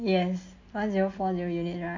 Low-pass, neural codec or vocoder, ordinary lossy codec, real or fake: 7.2 kHz; none; MP3, 48 kbps; real